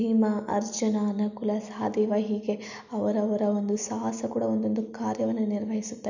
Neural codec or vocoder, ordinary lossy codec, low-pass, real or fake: none; none; 7.2 kHz; real